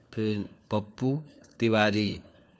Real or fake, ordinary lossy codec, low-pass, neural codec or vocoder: fake; none; none; codec, 16 kHz, 4 kbps, FunCodec, trained on LibriTTS, 50 frames a second